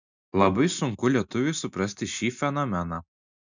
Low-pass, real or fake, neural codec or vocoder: 7.2 kHz; real; none